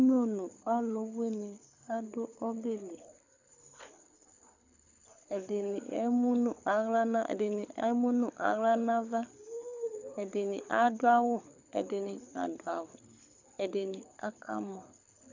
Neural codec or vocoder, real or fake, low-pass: codec, 24 kHz, 6 kbps, HILCodec; fake; 7.2 kHz